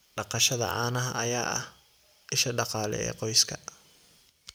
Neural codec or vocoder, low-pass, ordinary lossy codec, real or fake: none; none; none; real